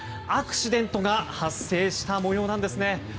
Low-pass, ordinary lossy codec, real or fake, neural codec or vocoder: none; none; real; none